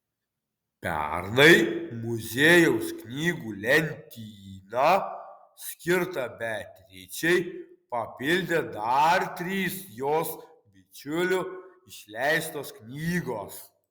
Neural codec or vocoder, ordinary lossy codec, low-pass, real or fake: none; Opus, 64 kbps; 19.8 kHz; real